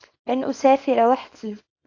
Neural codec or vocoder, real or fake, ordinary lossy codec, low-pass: codec, 24 kHz, 0.9 kbps, WavTokenizer, small release; fake; AAC, 48 kbps; 7.2 kHz